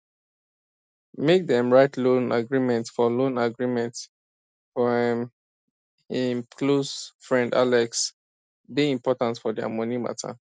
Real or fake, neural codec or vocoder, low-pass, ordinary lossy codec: real; none; none; none